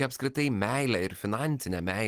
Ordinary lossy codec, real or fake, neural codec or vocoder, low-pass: Opus, 24 kbps; real; none; 14.4 kHz